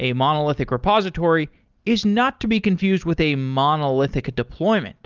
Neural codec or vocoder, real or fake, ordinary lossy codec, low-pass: none; real; Opus, 32 kbps; 7.2 kHz